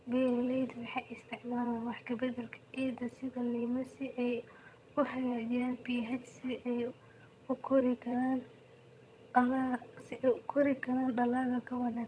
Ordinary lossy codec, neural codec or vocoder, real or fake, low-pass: none; vocoder, 22.05 kHz, 80 mel bands, HiFi-GAN; fake; none